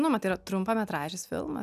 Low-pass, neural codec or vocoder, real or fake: 14.4 kHz; none; real